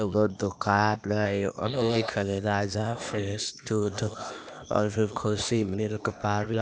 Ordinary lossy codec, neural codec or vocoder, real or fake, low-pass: none; codec, 16 kHz, 0.8 kbps, ZipCodec; fake; none